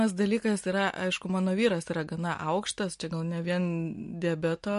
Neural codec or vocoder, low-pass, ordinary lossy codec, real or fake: none; 10.8 kHz; MP3, 48 kbps; real